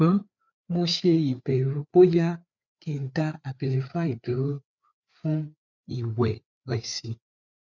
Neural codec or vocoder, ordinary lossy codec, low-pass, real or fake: codec, 16 kHz, 4 kbps, FreqCodec, larger model; none; 7.2 kHz; fake